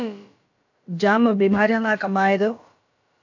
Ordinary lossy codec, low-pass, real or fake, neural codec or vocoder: AAC, 48 kbps; 7.2 kHz; fake; codec, 16 kHz, about 1 kbps, DyCAST, with the encoder's durations